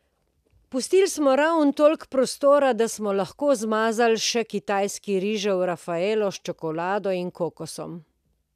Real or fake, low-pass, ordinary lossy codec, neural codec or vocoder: real; 14.4 kHz; none; none